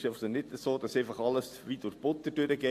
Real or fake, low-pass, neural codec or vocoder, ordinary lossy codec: real; 14.4 kHz; none; AAC, 64 kbps